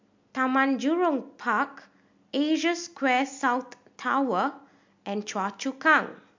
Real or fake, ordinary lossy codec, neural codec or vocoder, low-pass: real; MP3, 64 kbps; none; 7.2 kHz